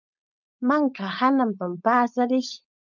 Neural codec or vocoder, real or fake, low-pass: codec, 16 kHz, 4.8 kbps, FACodec; fake; 7.2 kHz